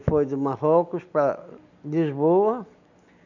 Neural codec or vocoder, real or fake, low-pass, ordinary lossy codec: none; real; 7.2 kHz; none